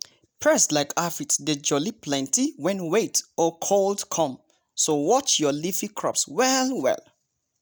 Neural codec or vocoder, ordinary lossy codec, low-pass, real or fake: none; none; none; real